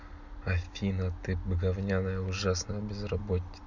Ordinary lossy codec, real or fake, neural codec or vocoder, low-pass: none; real; none; 7.2 kHz